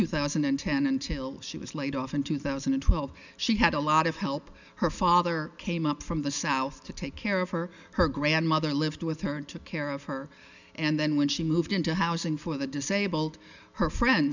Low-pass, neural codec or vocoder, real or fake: 7.2 kHz; none; real